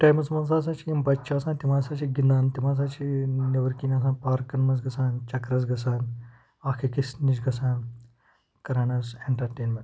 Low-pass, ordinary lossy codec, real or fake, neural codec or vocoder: none; none; real; none